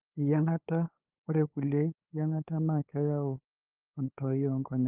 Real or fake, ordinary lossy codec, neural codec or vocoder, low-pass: fake; Opus, 24 kbps; codec, 16 kHz, 8 kbps, FunCodec, trained on LibriTTS, 25 frames a second; 3.6 kHz